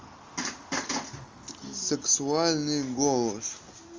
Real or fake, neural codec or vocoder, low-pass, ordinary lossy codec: real; none; 7.2 kHz; Opus, 32 kbps